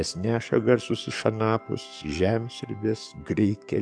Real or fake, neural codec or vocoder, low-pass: fake; codec, 44.1 kHz, 7.8 kbps, DAC; 9.9 kHz